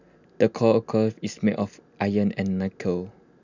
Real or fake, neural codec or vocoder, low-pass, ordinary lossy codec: real; none; 7.2 kHz; none